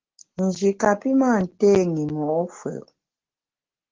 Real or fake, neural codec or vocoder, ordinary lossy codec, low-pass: real; none; Opus, 16 kbps; 7.2 kHz